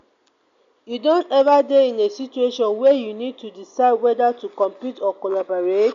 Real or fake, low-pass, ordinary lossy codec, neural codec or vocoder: real; 7.2 kHz; MP3, 64 kbps; none